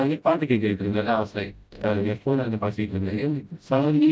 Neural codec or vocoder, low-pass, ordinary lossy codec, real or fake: codec, 16 kHz, 0.5 kbps, FreqCodec, smaller model; none; none; fake